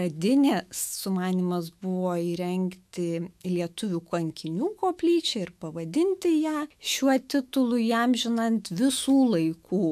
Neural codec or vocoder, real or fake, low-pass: autoencoder, 48 kHz, 128 numbers a frame, DAC-VAE, trained on Japanese speech; fake; 14.4 kHz